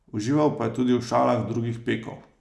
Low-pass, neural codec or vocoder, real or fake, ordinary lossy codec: none; none; real; none